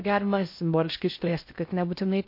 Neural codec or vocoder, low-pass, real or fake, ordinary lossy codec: codec, 16 kHz in and 24 kHz out, 0.6 kbps, FocalCodec, streaming, 4096 codes; 5.4 kHz; fake; MP3, 32 kbps